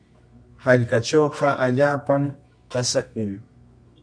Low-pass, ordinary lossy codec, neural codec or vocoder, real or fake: 9.9 kHz; MP3, 48 kbps; codec, 24 kHz, 0.9 kbps, WavTokenizer, medium music audio release; fake